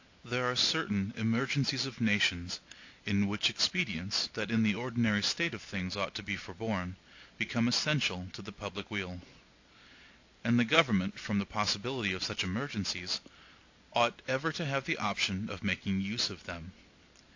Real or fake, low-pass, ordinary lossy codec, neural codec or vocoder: real; 7.2 kHz; AAC, 48 kbps; none